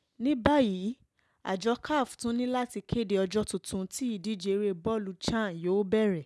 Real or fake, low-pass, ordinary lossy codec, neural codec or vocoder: real; none; none; none